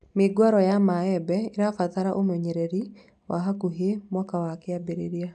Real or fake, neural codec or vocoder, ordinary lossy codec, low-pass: real; none; none; 9.9 kHz